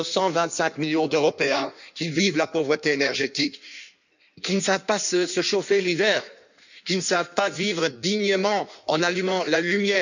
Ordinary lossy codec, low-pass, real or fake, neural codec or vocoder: none; 7.2 kHz; fake; codec, 16 kHz in and 24 kHz out, 1.1 kbps, FireRedTTS-2 codec